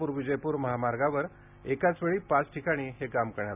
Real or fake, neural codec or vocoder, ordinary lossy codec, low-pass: real; none; none; 3.6 kHz